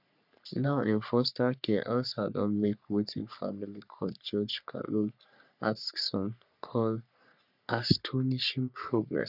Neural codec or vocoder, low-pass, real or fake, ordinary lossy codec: codec, 44.1 kHz, 3.4 kbps, Pupu-Codec; 5.4 kHz; fake; none